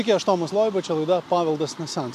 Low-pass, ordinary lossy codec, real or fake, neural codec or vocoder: 14.4 kHz; MP3, 96 kbps; real; none